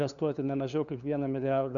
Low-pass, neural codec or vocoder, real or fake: 7.2 kHz; codec, 16 kHz, 2 kbps, FunCodec, trained on LibriTTS, 25 frames a second; fake